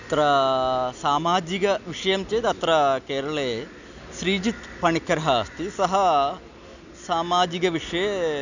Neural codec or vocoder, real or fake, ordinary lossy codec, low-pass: none; real; none; 7.2 kHz